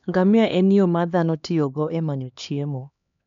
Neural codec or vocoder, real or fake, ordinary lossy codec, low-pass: codec, 16 kHz, 2 kbps, X-Codec, HuBERT features, trained on LibriSpeech; fake; none; 7.2 kHz